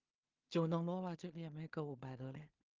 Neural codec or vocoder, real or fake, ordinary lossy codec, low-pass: codec, 16 kHz in and 24 kHz out, 0.4 kbps, LongCat-Audio-Codec, two codebook decoder; fake; Opus, 32 kbps; 7.2 kHz